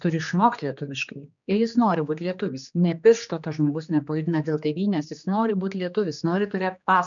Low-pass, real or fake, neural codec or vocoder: 7.2 kHz; fake; codec, 16 kHz, 2 kbps, X-Codec, HuBERT features, trained on general audio